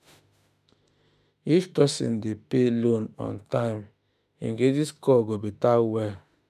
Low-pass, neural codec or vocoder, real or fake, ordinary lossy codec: 14.4 kHz; autoencoder, 48 kHz, 32 numbers a frame, DAC-VAE, trained on Japanese speech; fake; none